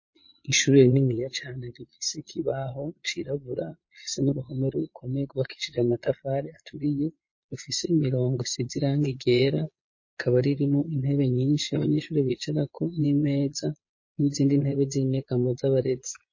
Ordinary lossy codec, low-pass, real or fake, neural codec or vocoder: MP3, 32 kbps; 7.2 kHz; fake; vocoder, 22.05 kHz, 80 mel bands, Vocos